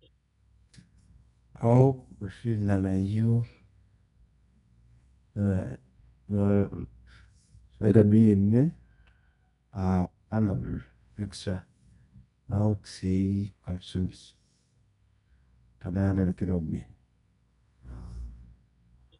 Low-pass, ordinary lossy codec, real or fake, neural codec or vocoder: 10.8 kHz; none; fake; codec, 24 kHz, 0.9 kbps, WavTokenizer, medium music audio release